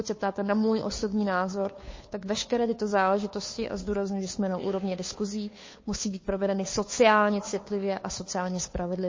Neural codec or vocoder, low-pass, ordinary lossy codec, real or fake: codec, 16 kHz, 2 kbps, FunCodec, trained on Chinese and English, 25 frames a second; 7.2 kHz; MP3, 32 kbps; fake